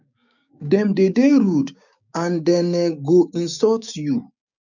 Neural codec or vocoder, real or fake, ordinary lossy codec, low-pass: codec, 16 kHz, 6 kbps, DAC; fake; none; 7.2 kHz